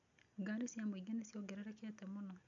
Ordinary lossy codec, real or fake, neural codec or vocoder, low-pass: none; real; none; 7.2 kHz